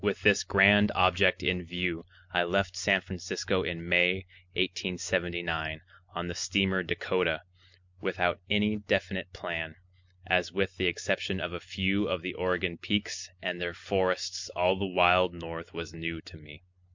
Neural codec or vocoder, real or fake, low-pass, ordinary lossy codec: none; real; 7.2 kHz; MP3, 64 kbps